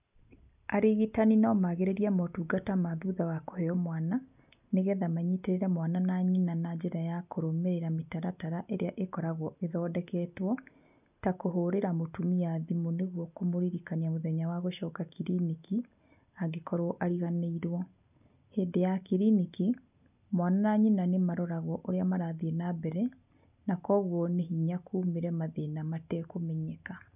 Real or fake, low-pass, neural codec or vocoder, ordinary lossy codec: real; 3.6 kHz; none; none